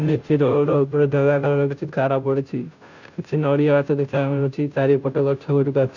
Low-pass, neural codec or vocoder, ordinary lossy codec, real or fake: 7.2 kHz; codec, 16 kHz, 0.5 kbps, FunCodec, trained on Chinese and English, 25 frames a second; none; fake